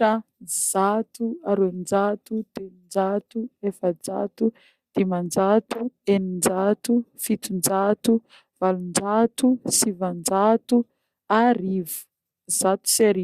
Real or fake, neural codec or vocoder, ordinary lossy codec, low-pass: real; none; Opus, 64 kbps; 14.4 kHz